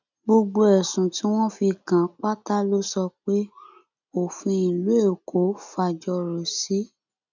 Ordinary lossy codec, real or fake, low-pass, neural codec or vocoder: none; real; 7.2 kHz; none